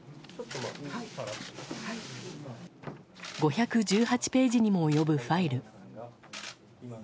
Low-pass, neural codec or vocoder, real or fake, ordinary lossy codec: none; none; real; none